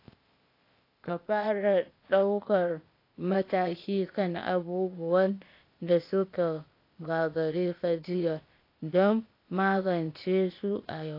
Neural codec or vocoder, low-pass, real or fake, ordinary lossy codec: codec, 16 kHz, 0.8 kbps, ZipCodec; 5.4 kHz; fake; AAC, 32 kbps